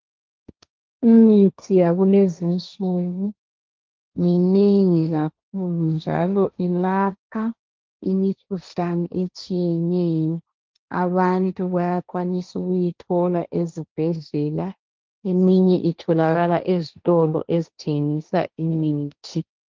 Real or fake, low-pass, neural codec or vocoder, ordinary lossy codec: fake; 7.2 kHz; codec, 16 kHz, 1.1 kbps, Voila-Tokenizer; Opus, 32 kbps